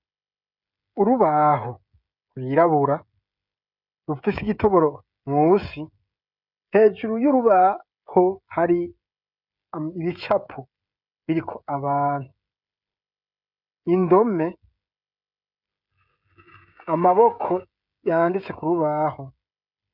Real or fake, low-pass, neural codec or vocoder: fake; 5.4 kHz; codec, 16 kHz, 16 kbps, FreqCodec, smaller model